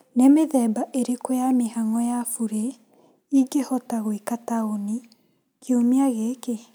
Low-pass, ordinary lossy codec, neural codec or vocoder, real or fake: none; none; none; real